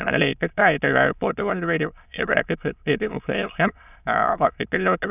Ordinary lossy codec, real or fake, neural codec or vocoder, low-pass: none; fake; autoencoder, 22.05 kHz, a latent of 192 numbers a frame, VITS, trained on many speakers; 3.6 kHz